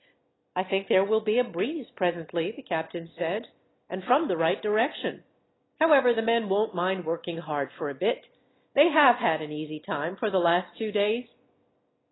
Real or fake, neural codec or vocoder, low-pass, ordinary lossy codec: real; none; 7.2 kHz; AAC, 16 kbps